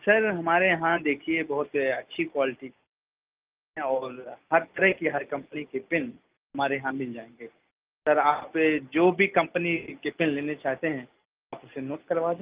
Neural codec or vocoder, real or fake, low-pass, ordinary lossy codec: none; real; 3.6 kHz; Opus, 32 kbps